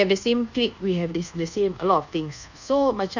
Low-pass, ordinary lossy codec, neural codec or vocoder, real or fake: 7.2 kHz; none; codec, 24 kHz, 1.2 kbps, DualCodec; fake